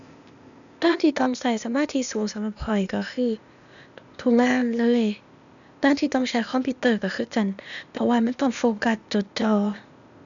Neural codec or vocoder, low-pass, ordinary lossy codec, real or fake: codec, 16 kHz, 0.8 kbps, ZipCodec; 7.2 kHz; none; fake